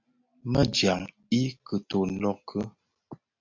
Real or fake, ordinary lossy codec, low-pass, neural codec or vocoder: real; MP3, 64 kbps; 7.2 kHz; none